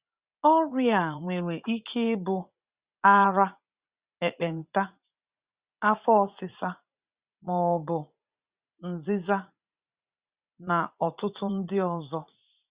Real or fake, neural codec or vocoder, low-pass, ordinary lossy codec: fake; vocoder, 44.1 kHz, 80 mel bands, Vocos; 3.6 kHz; Opus, 64 kbps